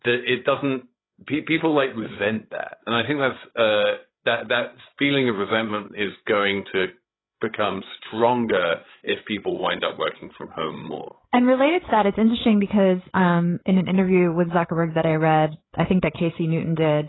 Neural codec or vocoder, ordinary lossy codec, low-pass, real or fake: codec, 16 kHz, 8 kbps, FreqCodec, larger model; AAC, 16 kbps; 7.2 kHz; fake